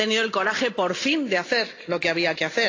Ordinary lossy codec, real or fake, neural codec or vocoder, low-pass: AAC, 32 kbps; real; none; 7.2 kHz